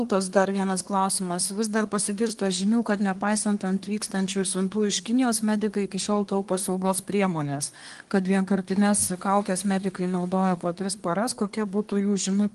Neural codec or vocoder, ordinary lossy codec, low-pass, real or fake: codec, 24 kHz, 1 kbps, SNAC; Opus, 24 kbps; 10.8 kHz; fake